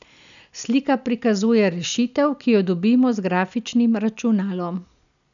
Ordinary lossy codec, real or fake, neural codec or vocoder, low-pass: none; real; none; 7.2 kHz